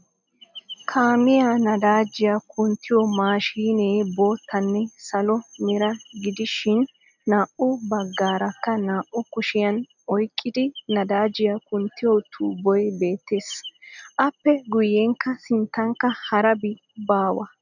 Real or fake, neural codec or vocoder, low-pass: real; none; 7.2 kHz